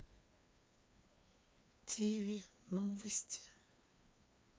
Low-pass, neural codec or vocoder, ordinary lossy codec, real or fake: none; codec, 16 kHz, 2 kbps, FreqCodec, larger model; none; fake